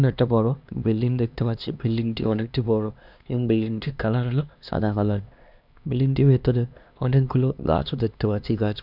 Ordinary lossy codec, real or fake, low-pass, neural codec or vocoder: none; fake; 5.4 kHz; codec, 16 kHz, 2 kbps, X-Codec, HuBERT features, trained on LibriSpeech